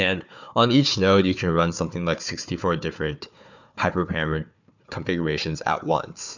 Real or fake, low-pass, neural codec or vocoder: fake; 7.2 kHz; codec, 16 kHz, 4 kbps, FunCodec, trained on Chinese and English, 50 frames a second